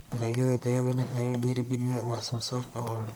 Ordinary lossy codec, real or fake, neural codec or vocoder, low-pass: none; fake; codec, 44.1 kHz, 1.7 kbps, Pupu-Codec; none